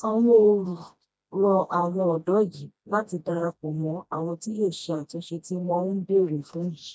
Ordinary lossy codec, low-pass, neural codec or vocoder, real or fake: none; none; codec, 16 kHz, 1 kbps, FreqCodec, smaller model; fake